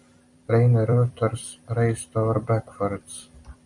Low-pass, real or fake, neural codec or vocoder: 10.8 kHz; fake; vocoder, 44.1 kHz, 128 mel bands every 256 samples, BigVGAN v2